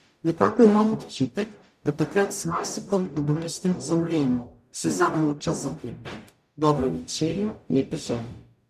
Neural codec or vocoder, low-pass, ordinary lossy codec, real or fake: codec, 44.1 kHz, 0.9 kbps, DAC; 14.4 kHz; none; fake